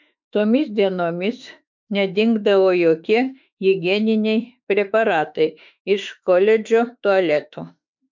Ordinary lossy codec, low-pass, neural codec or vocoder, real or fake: MP3, 64 kbps; 7.2 kHz; autoencoder, 48 kHz, 32 numbers a frame, DAC-VAE, trained on Japanese speech; fake